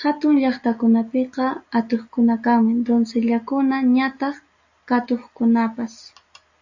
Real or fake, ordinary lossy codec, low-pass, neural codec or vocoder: real; AAC, 48 kbps; 7.2 kHz; none